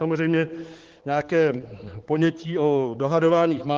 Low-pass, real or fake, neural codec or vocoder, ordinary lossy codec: 7.2 kHz; fake; codec, 16 kHz, 4 kbps, X-Codec, HuBERT features, trained on balanced general audio; Opus, 16 kbps